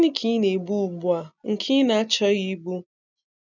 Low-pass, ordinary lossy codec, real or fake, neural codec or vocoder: 7.2 kHz; none; real; none